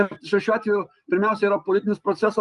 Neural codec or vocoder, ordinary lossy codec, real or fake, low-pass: none; Opus, 32 kbps; real; 10.8 kHz